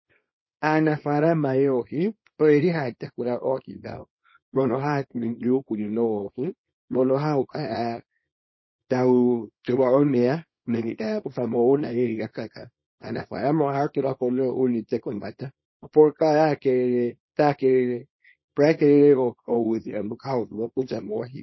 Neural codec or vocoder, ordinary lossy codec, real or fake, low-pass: codec, 24 kHz, 0.9 kbps, WavTokenizer, small release; MP3, 24 kbps; fake; 7.2 kHz